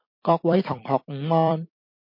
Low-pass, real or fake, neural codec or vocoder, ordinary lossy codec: 5.4 kHz; fake; vocoder, 44.1 kHz, 128 mel bands every 256 samples, BigVGAN v2; MP3, 32 kbps